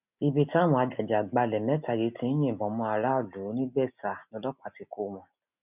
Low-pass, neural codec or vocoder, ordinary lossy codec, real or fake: 3.6 kHz; none; none; real